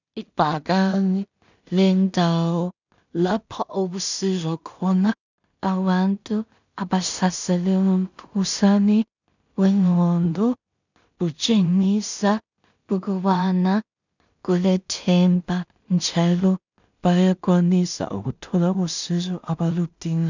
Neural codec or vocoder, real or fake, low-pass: codec, 16 kHz in and 24 kHz out, 0.4 kbps, LongCat-Audio-Codec, two codebook decoder; fake; 7.2 kHz